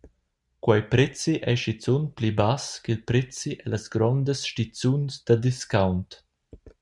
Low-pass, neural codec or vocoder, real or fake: 10.8 kHz; none; real